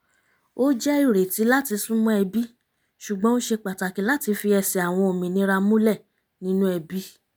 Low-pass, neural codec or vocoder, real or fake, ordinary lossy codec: none; none; real; none